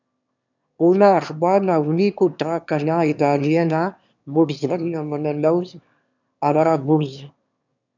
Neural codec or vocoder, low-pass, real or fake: autoencoder, 22.05 kHz, a latent of 192 numbers a frame, VITS, trained on one speaker; 7.2 kHz; fake